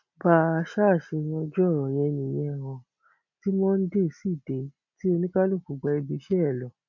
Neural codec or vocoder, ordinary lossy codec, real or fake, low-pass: none; none; real; 7.2 kHz